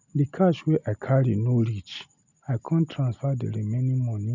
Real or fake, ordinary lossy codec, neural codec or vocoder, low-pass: real; none; none; 7.2 kHz